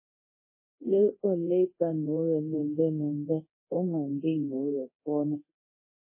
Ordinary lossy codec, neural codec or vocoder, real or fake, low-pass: MP3, 16 kbps; codec, 24 kHz, 0.9 kbps, DualCodec; fake; 3.6 kHz